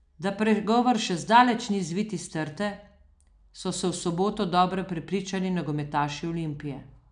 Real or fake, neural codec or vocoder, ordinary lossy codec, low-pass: real; none; none; 9.9 kHz